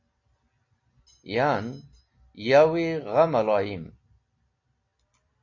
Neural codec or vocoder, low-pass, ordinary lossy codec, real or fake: none; 7.2 kHz; MP3, 48 kbps; real